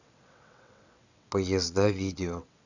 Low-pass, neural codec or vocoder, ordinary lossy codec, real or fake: 7.2 kHz; vocoder, 22.05 kHz, 80 mel bands, WaveNeXt; none; fake